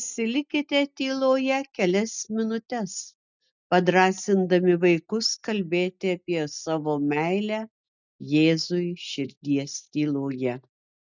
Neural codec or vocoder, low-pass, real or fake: none; 7.2 kHz; real